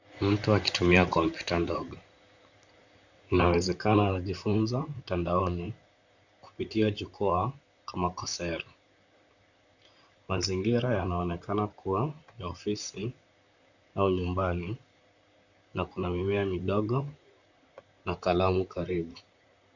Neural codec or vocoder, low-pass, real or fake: vocoder, 44.1 kHz, 128 mel bands, Pupu-Vocoder; 7.2 kHz; fake